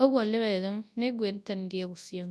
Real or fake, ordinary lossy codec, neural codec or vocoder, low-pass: fake; none; codec, 24 kHz, 0.9 kbps, WavTokenizer, large speech release; none